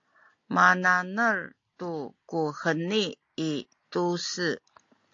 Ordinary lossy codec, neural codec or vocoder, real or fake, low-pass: AAC, 48 kbps; none; real; 7.2 kHz